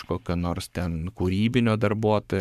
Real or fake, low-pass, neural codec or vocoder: fake; 14.4 kHz; codec, 44.1 kHz, 7.8 kbps, Pupu-Codec